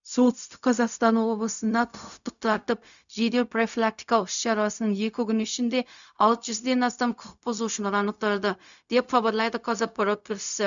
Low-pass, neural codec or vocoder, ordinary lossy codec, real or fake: 7.2 kHz; codec, 16 kHz, 0.4 kbps, LongCat-Audio-Codec; none; fake